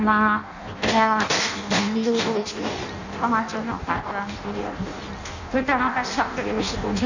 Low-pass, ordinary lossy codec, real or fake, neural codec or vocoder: 7.2 kHz; none; fake; codec, 16 kHz in and 24 kHz out, 0.6 kbps, FireRedTTS-2 codec